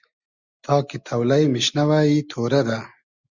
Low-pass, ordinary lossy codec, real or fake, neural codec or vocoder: 7.2 kHz; Opus, 64 kbps; real; none